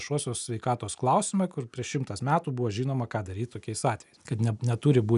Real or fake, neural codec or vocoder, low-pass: real; none; 10.8 kHz